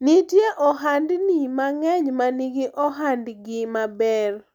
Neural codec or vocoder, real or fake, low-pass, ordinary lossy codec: none; real; 19.8 kHz; none